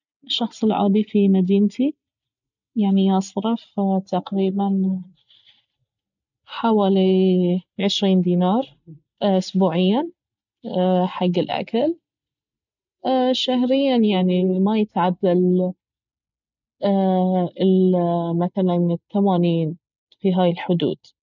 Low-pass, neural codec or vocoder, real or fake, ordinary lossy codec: 7.2 kHz; none; real; none